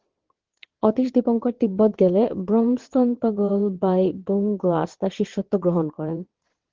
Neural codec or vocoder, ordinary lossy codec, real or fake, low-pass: vocoder, 22.05 kHz, 80 mel bands, WaveNeXt; Opus, 16 kbps; fake; 7.2 kHz